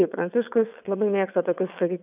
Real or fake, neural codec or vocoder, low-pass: fake; vocoder, 22.05 kHz, 80 mel bands, WaveNeXt; 3.6 kHz